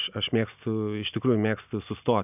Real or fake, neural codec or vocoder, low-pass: real; none; 3.6 kHz